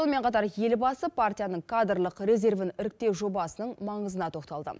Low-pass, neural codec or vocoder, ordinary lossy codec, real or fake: none; none; none; real